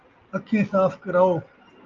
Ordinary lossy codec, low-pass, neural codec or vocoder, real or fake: Opus, 24 kbps; 7.2 kHz; none; real